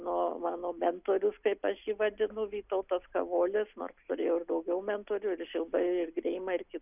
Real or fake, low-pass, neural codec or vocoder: real; 3.6 kHz; none